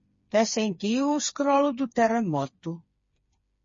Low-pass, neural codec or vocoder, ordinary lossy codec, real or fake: 7.2 kHz; codec, 16 kHz, 4 kbps, FreqCodec, smaller model; MP3, 32 kbps; fake